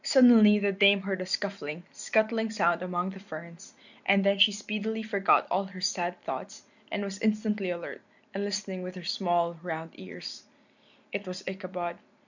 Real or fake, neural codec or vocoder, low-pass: real; none; 7.2 kHz